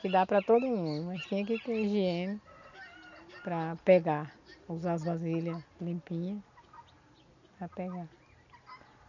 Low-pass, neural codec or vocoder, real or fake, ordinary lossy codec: 7.2 kHz; none; real; none